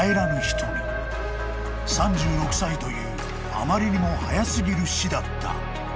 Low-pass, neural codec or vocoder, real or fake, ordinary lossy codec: none; none; real; none